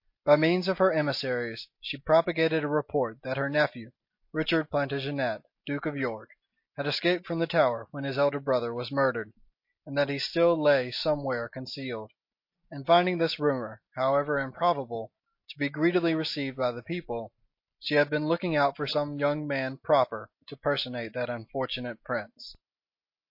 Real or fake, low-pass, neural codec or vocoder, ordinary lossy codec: real; 5.4 kHz; none; MP3, 32 kbps